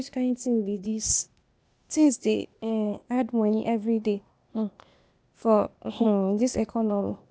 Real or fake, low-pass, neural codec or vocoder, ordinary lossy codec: fake; none; codec, 16 kHz, 0.8 kbps, ZipCodec; none